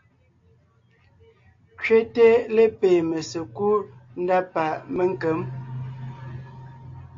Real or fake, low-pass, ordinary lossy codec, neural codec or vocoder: real; 7.2 kHz; AAC, 48 kbps; none